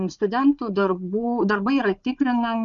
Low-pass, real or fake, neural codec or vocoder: 7.2 kHz; fake; codec, 16 kHz, 4 kbps, FunCodec, trained on Chinese and English, 50 frames a second